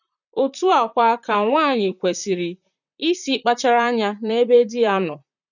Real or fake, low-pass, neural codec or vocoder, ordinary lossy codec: fake; 7.2 kHz; vocoder, 44.1 kHz, 128 mel bands, Pupu-Vocoder; none